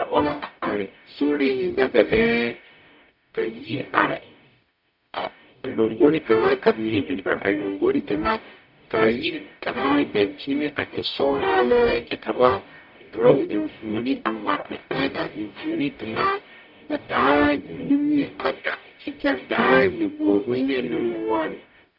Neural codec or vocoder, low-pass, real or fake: codec, 44.1 kHz, 0.9 kbps, DAC; 5.4 kHz; fake